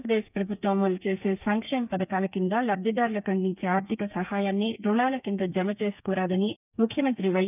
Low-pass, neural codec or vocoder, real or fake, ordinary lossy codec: 3.6 kHz; codec, 32 kHz, 1.9 kbps, SNAC; fake; none